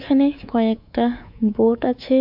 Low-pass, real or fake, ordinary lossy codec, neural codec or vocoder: 5.4 kHz; fake; MP3, 48 kbps; codec, 24 kHz, 3.1 kbps, DualCodec